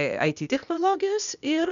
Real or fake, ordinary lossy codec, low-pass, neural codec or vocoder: fake; MP3, 96 kbps; 7.2 kHz; codec, 16 kHz, 0.8 kbps, ZipCodec